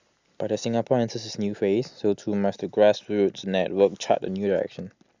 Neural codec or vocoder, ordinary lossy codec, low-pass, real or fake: none; Opus, 64 kbps; 7.2 kHz; real